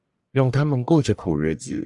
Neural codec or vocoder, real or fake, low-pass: codec, 44.1 kHz, 1.7 kbps, Pupu-Codec; fake; 10.8 kHz